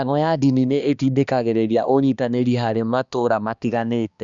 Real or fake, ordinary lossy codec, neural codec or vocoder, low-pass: fake; Opus, 64 kbps; codec, 16 kHz, 2 kbps, X-Codec, HuBERT features, trained on balanced general audio; 7.2 kHz